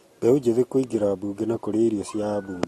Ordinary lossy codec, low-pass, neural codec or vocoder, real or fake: AAC, 32 kbps; 19.8 kHz; none; real